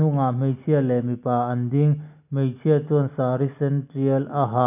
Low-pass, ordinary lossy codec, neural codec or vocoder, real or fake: 3.6 kHz; none; none; real